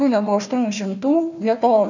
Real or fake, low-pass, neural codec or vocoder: fake; 7.2 kHz; codec, 16 kHz, 1 kbps, FunCodec, trained on Chinese and English, 50 frames a second